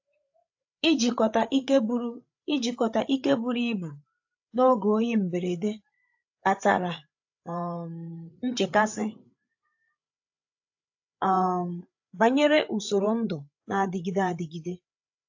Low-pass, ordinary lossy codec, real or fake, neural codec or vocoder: 7.2 kHz; AAC, 48 kbps; fake; codec, 16 kHz, 8 kbps, FreqCodec, larger model